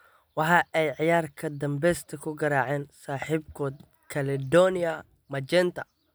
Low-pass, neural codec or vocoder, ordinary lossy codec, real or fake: none; none; none; real